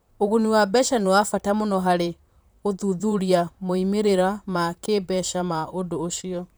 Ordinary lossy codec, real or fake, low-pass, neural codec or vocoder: none; fake; none; vocoder, 44.1 kHz, 128 mel bands, Pupu-Vocoder